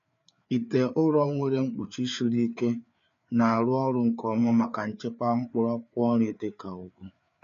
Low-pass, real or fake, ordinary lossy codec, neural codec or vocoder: 7.2 kHz; fake; none; codec, 16 kHz, 4 kbps, FreqCodec, larger model